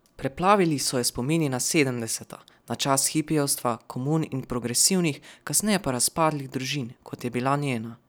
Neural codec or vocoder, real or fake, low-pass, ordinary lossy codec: none; real; none; none